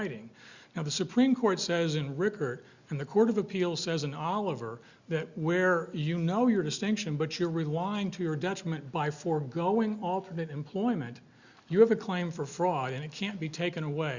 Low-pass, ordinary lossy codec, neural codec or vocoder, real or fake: 7.2 kHz; Opus, 64 kbps; none; real